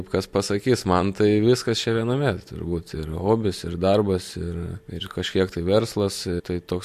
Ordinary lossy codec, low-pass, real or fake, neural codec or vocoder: MP3, 64 kbps; 14.4 kHz; real; none